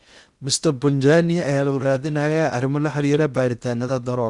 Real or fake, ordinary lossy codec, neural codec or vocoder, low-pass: fake; Opus, 64 kbps; codec, 16 kHz in and 24 kHz out, 0.8 kbps, FocalCodec, streaming, 65536 codes; 10.8 kHz